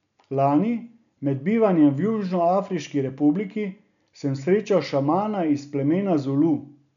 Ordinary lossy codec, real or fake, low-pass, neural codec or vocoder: none; real; 7.2 kHz; none